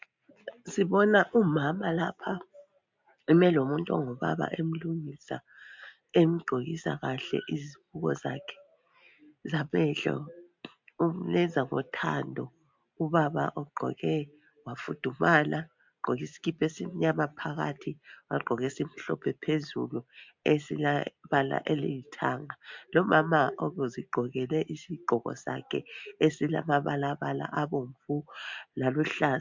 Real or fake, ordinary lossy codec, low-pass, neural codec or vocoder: real; MP3, 64 kbps; 7.2 kHz; none